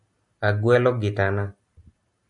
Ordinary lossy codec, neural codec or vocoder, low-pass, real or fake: MP3, 96 kbps; none; 10.8 kHz; real